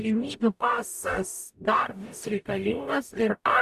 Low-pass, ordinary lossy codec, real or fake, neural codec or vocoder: 14.4 kHz; Opus, 64 kbps; fake; codec, 44.1 kHz, 0.9 kbps, DAC